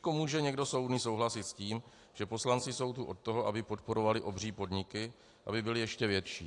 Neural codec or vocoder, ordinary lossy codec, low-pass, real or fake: none; AAC, 48 kbps; 10.8 kHz; real